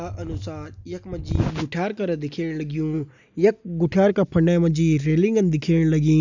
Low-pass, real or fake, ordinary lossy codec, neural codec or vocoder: 7.2 kHz; real; none; none